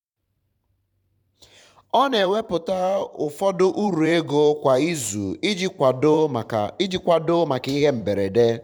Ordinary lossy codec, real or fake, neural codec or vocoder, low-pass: none; fake; vocoder, 44.1 kHz, 128 mel bands every 512 samples, BigVGAN v2; 19.8 kHz